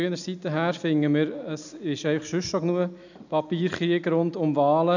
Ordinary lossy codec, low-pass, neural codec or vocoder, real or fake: none; 7.2 kHz; none; real